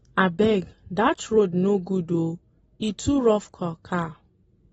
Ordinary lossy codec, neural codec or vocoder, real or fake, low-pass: AAC, 24 kbps; none; real; 19.8 kHz